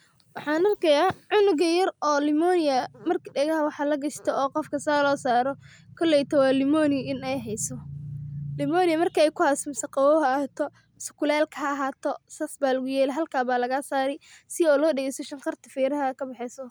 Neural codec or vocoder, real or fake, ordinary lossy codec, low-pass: none; real; none; none